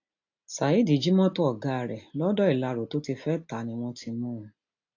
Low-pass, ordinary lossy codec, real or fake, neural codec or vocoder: 7.2 kHz; AAC, 48 kbps; real; none